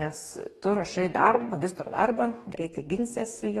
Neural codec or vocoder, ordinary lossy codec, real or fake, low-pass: codec, 44.1 kHz, 2.6 kbps, DAC; AAC, 32 kbps; fake; 19.8 kHz